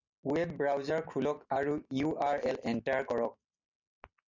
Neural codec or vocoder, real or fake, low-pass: none; real; 7.2 kHz